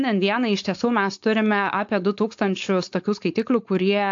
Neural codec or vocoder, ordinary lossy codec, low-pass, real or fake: codec, 16 kHz, 4.8 kbps, FACodec; AAC, 64 kbps; 7.2 kHz; fake